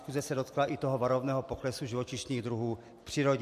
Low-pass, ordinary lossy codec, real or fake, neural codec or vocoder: 14.4 kHz; MP3, 64 kbps; real; none